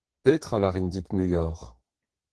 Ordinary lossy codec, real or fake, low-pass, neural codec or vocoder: Opus, 16 kbps; fake; 10.8 kHz; codec, 32 kHz, 1.9 kbps, SNAC